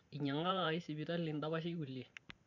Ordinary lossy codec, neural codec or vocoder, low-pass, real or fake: none; vocoder, 44.1 kHz, 128 mel bands every 512 samples, BigVGAN v2; 7.2 kHz; fake